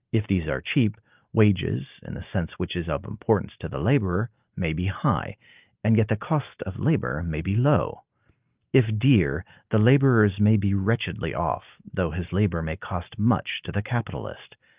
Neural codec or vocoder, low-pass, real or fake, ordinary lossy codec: none; 3.6 kHz; real; Opus, 24 kbps